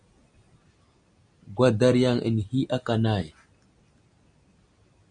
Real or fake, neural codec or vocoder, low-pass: real; none; 9.9 kHz